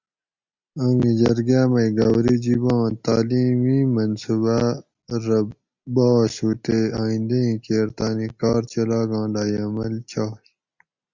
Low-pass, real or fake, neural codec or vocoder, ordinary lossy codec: 7.2 kHz; real; none; Opus, 64 kbps